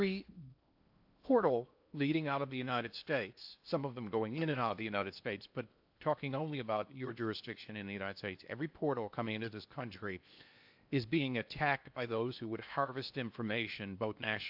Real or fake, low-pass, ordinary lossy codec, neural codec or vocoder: fake; 5.4 kHz; AAC, 48 kbps; codec, 16 kHz in and 24 kHz out, 0.8 kbps, FocalCodec, streaming, 65536 codes